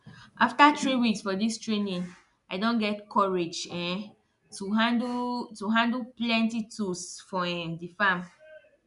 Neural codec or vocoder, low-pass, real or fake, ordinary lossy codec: none; 10.8 kHz; real; none